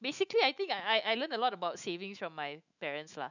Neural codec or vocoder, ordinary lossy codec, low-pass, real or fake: autoencoder, 48 kHz, 128 numbers a frame, DAC-VAE, trained on Japanese speech; none; 7.2 kHz; fake